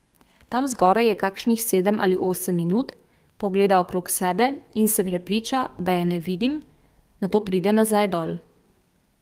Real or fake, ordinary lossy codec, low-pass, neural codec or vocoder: fake; Opus, 32 kbps; 14.4 kHz; codec, 32 kHz, 1.9 kbps, SNAC